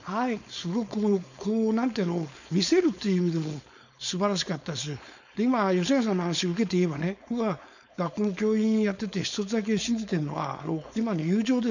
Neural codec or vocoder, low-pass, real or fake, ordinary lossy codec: codec, 16 kHz, 4.8 kbps, FACodec; 7.2 kHz; fake; none